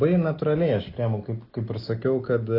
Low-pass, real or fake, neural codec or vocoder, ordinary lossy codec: 5.4 kHz; real; none; Opus, 32 kbps